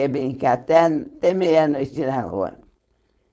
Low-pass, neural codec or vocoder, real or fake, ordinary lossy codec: none; codec, 16 kHz, 4.8 kbps, FACodec; fake; none